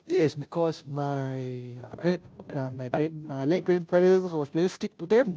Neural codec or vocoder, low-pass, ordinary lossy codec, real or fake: codec, 16 kHz, 0.5 kbps, FunCodec, trained on Chinese and English, 25 frames a second; none; none; fake